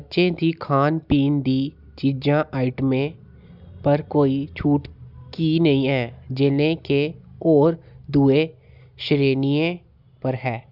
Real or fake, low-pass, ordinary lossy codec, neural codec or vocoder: real; 5.4 kHz; none; none